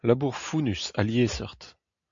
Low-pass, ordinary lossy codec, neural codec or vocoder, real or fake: 7.2 kHz; AAC, 64 kbps; none; real